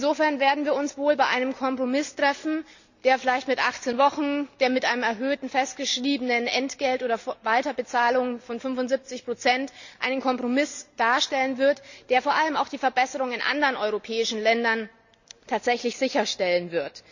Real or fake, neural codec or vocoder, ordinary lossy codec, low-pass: real; none; none; 7.2 kHz